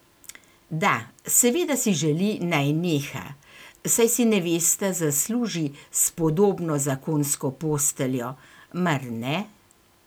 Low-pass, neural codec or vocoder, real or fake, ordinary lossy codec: none; none; real; none